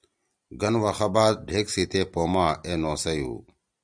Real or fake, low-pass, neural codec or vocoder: real; 9.9 kHz; none